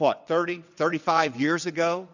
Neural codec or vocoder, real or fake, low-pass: vocoder, 22.05 kHz, 80 mel bands, WaveNeXt; fake; 7.2 kHz